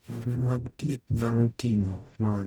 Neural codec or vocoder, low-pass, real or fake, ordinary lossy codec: codec, 44.1 kHz, 0.9 kbps, DAC; none; fake; none